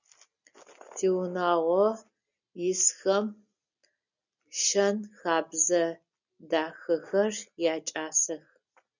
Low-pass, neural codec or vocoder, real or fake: 7.2 kHz; none; real